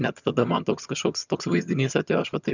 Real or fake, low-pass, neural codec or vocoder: fake; 7.2 kHz; vocoder, 22.05 kHz, 80 mel bands, HiFi-GAN